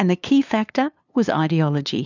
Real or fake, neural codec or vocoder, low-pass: fake; codec, 16 kHz, 2 kbps, FunCodec, trained on LibriTTS, 25 frames a second; 7.2 kHz